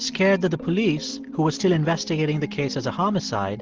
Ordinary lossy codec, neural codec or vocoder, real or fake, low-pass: Opus, 16 kbps; none; real; 7.2 kHz